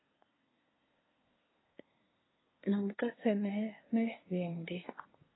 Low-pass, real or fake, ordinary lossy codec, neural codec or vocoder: 7.2 kHz; fake; AAC, 16 kbps; codec, 24 kHz, 1 kbps, SNAC